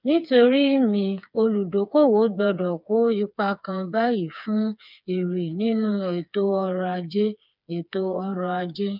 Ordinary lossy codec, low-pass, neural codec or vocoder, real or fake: none; 5.4 kHz; codec, 16 kHz, 4 kbps, FreqCodec, smaller model; fake